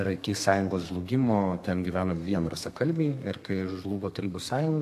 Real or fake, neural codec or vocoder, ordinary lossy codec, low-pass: fake; codec, 32 kHz, 1.9 kbps, SNAC; AAC, 64 kbps; 14.4 kHz